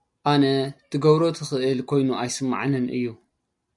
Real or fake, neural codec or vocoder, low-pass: real; none; 10.8 kHz